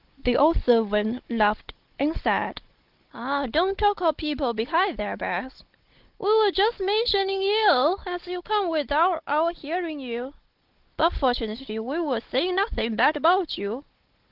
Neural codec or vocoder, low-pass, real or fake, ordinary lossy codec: codec, 16 kHz, 16 kbps, FunCodec, trained on Chinese and English, 50 frames a second; 5.4 kHz; fake; Opus, 16 kbps